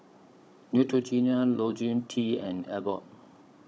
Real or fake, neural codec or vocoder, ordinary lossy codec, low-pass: fake; codec, 16 kHz, 16 kbps, FunCodec, trained on Chinese and English, 50 frames a second; none; none